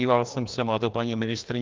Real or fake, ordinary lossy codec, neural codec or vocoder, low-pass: fake; Opus, 24 kbps; codec, 16 kHz, 2 kbps, FreqCodec, larger model; 7.2 kHz